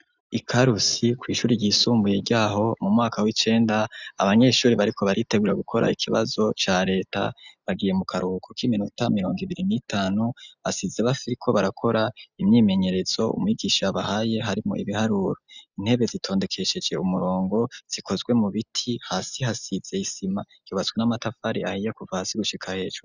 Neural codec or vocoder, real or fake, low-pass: none; real; 7.2 kHz